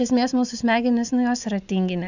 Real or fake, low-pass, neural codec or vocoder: real; 7.2 kHz; none